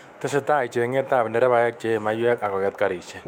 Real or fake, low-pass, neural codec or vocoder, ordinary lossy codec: fake; 19.8 kHz; autoencoder, 48 kHz, 128 numbers a frame, DAC-VAE, trained on Japanese speech; MP3, 96 kbps